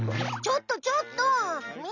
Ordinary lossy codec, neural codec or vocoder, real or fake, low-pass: none; none; real; 7.2 kHz